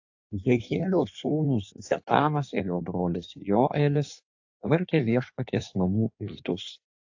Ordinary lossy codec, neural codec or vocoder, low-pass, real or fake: AAC, 48 kbps; codec, 16 kHz in and 24 kHz out, 1.1 kbps, FireRedTTS-2 codec; 7.2 kHz; fake